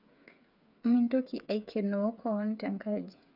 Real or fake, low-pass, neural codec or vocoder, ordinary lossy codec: fake; 5.4 kHz; codec, 16 kHz, 8 kbps, FreqCodec, smaller model; none